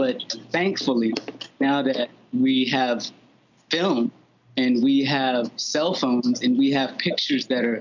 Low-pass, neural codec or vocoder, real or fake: 7.2 kHz; none; real